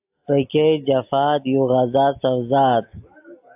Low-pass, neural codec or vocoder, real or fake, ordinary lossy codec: 3.6 kHz; none; real; AAC, 32 kbps